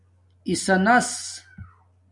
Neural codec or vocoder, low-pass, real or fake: none; 10.8 kHz; real